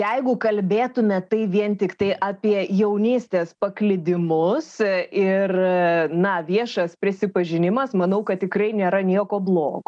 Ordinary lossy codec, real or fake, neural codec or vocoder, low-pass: MP3, 96 kbps; real; none; 9.9 kHz